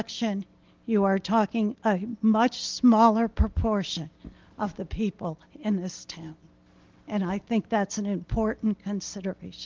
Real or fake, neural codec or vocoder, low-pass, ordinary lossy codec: real; none; 7.2 kHz; Opus, 16 kbps